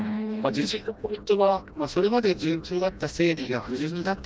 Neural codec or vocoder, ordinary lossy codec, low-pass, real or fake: codec, 16 kHz, 1 kbps, FreqCodec, smaller model; none; none; fake